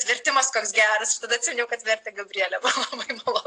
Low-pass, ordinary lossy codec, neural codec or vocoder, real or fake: 9.9 kHz; AAC, 48 kbps; none; real